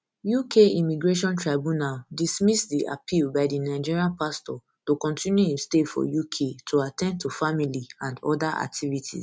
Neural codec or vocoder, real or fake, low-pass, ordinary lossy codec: none; real; none; none